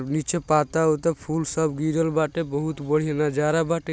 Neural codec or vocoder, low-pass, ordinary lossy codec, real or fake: none; none; none; real